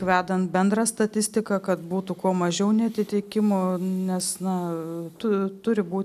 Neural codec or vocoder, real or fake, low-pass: none; real; 14.4 kHz